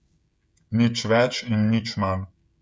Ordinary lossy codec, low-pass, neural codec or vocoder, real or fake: none; none; codec, 16 kHz, 16 kbps, FreqCodec, smaller model; fake